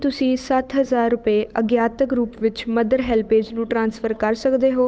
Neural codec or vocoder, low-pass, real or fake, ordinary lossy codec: codec, 16 kHz, 8 kbps, FunCodec, trained on Chinese and English, 25 frames a second; none; fake; none